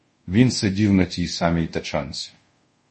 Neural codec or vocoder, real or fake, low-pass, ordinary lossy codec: codec, 24 kHz, 0.5 kbps, DualCodec; fake; 10.8 kHz; MP3, 32 kbps